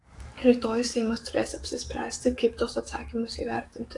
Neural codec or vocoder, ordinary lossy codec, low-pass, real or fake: codec, 44.1 kHz, 7.8 kbps, DAC; AAC, 48 kbps; 10.8 kHz; fake